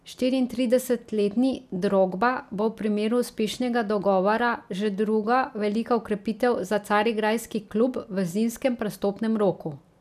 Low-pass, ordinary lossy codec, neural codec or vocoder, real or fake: 14.4 kHz; none; none; real